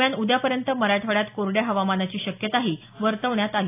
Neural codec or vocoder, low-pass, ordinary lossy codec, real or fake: none; 3.6 kHz; AAC, 24 kbps; real